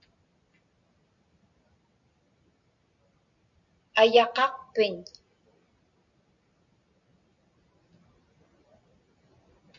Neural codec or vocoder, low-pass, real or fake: none; 7.2 kHz; real